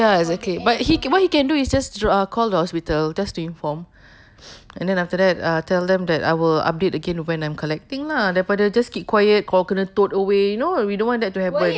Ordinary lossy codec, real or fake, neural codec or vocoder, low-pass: none; real; none; none